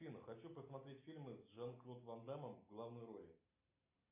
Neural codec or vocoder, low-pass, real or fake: none; 3.6 kHz; real